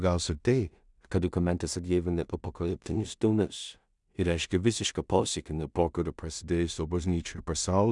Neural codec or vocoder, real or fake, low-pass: codec, 16 kHz in and 24 kHz out, 0.4 kbps, LongCat-Audio-Codec, two codebook decoder; fake; 10.8 kHz